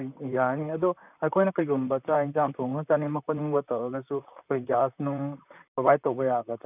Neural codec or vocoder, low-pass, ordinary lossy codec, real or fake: vocoder, 44.1 kHz, 128 mel bands, Pupu-Vocoder; 3.6 kHz; none; fake